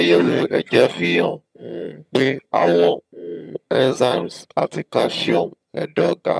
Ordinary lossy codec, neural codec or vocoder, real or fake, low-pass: none; vocoder, 22.05 kHz, 80 mel bands, HiFi-GAN; fake; none